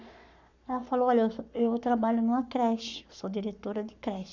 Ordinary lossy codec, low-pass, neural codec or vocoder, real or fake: none; 7.2 kHz; codec, 44.1 kHz, 7.8 kbps, Pupu-Codec; fake